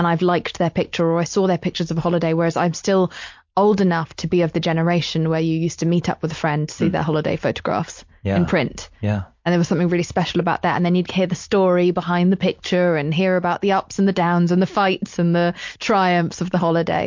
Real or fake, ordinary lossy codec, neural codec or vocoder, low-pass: real; MP3, 48 kbps; none; 7.2 kHz